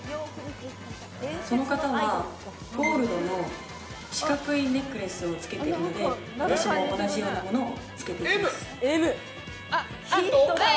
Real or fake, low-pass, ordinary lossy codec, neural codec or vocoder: real; none; none; none